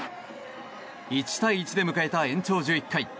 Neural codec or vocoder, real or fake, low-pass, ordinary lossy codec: none; real; none; none